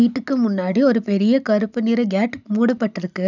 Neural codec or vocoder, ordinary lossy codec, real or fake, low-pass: none; none; real; 7.2 kHz